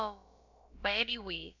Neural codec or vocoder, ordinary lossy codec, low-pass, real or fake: codec, 16 kHz, about 1 kbps, DyCAST, with the encoder's durations; none; 7.2 kHz; fake